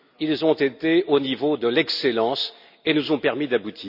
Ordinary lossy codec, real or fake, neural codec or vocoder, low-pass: none; real; none; 5.4 kHz